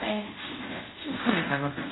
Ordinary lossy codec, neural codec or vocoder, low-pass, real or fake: AAC, 16 kbps; codec, 24 kHz, 0.5 kbps, DualCodec; 7.2 kHz; fake